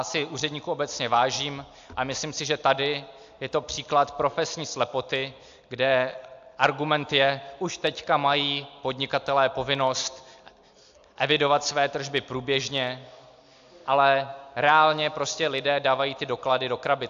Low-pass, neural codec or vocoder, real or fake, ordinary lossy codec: 7.2 kHz; none; real; AAC, 64 kbps